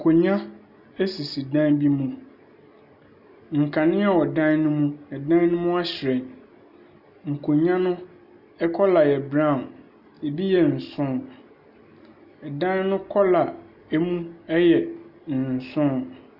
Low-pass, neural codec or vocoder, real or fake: 5.4 kHz; none; real